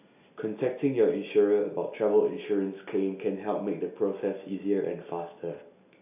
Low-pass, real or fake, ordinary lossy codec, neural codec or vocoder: 3.6 kHz; real; none; none